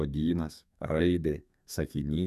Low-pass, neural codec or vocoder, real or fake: 14.4 kHz; codec, 32 kHz, 1.9 kbps, SNAC; fake